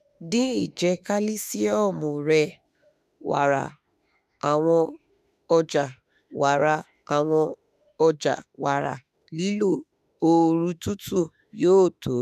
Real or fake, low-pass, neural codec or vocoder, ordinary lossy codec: fake; 14.4 kHz; autoencoder, 48 kHz, 32 numbers a frame, DAC-VAE, trained on Japanese speech; none